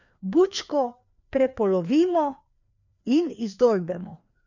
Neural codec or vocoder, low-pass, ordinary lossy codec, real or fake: codec, 16 kHz, 2 kbps, FreqCodec, larger model; 7.2 kHz; none; fake